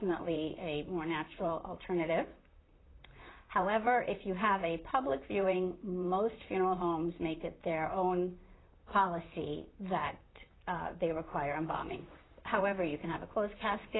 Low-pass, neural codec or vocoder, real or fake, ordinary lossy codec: 7.2 kHz; vocoder, 44.1 kHz, 128 mel bands, Pupu-Vocoder; fake; AAC, 16 kbps